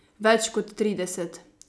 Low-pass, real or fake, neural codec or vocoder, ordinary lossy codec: none; real; none; none